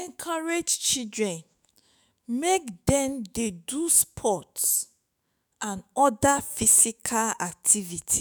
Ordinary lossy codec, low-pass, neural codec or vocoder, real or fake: none; none; autoencoder, 48 kHz, 128 numbers a frame, DAC-VAE, trained on Japanese speech; fake